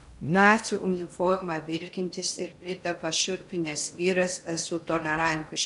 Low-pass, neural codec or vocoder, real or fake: 10.8 kHz; codec, 16 kHz in and 24 kHz out, 0.6 kbps, FocalCodec, streaming, 2048 codes; fake